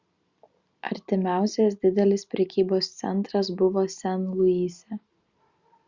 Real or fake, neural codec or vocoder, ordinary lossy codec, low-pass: real; none; Opus, 64 kbps; 7.2 kHz